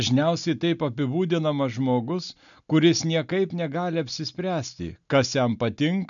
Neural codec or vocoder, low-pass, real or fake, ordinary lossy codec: none; 7.2 kHz; real; MP3, 96 kbps